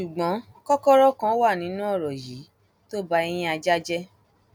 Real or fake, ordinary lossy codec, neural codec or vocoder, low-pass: real; none; none; 19.8 kHz